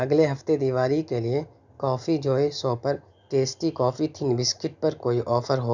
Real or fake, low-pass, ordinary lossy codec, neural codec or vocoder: real; 7.2 kHz; none; none